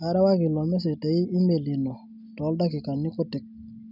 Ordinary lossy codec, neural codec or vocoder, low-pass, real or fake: none; none; 5.4 kHz; real